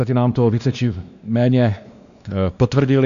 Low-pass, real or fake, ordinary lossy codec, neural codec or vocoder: 7.2 kHz; fake; MP3, 96 kbps; codec, 16 kHz, 2 kbps, X-Codec, WavLM features, trained on Multilingual LibriSpeech